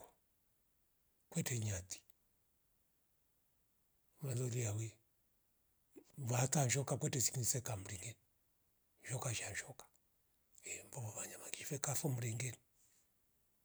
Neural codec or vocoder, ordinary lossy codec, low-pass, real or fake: none; none; none; real